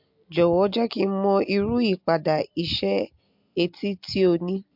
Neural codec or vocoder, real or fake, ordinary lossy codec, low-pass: none; real; MP3, 48 kbps; 5.4 kHz